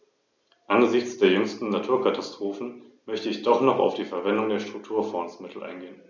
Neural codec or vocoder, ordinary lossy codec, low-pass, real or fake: none; none; 7.2 kHz; real